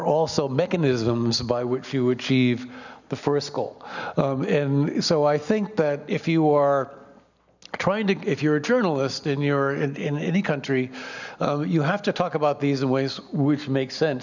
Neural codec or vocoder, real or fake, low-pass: none; real; 7.2 kHz